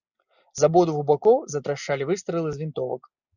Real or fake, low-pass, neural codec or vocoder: real; 7.2 kHz; none